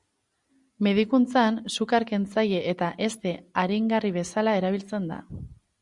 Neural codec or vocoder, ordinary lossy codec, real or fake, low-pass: none; Opus, 64 kbps; real; 10.8 kHz